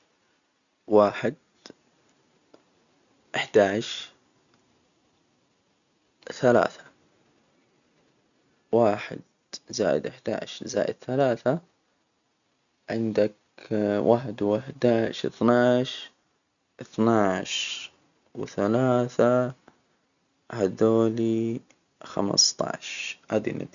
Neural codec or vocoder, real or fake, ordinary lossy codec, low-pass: none; real; none; 7.2 kHz